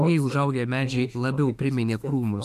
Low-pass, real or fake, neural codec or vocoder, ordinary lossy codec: 14.4 kHz; fake; autoencoder, 48 kHz, 32 numbers a frame, DAC-VAE, trained on Japanese speech; AAC, 96 kbps